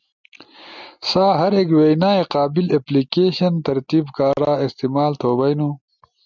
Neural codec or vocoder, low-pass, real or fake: none; 7.2 kHz; real